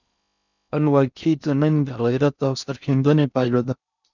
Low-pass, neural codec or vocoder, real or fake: 7.2 kHz; codec, 16 kHz in and 24 kHz out, 0.6 kbps, FocalCodec, streaming, 4096 codes; fake